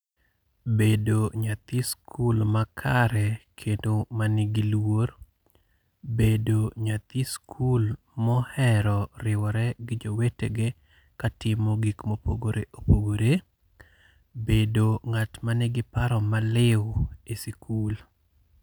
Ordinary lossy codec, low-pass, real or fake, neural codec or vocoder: none; none; real; none